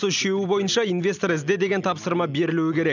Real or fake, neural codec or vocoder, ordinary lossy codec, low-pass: real; none; none; 7.2 kHz